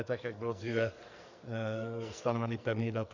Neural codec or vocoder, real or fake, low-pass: codec, 44.1 kHz, 2.6 kbps, SNAC; fake; 7.2 kHz